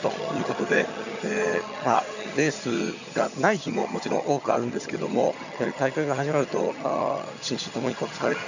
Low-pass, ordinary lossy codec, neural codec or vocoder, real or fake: 7.2 kHz; none; vocoder, 22.05 kHz, 80 mel bands, HiFi-GAN; fake